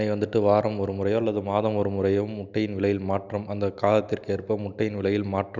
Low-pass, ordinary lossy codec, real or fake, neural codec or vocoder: 7.2 kHz; none; real; none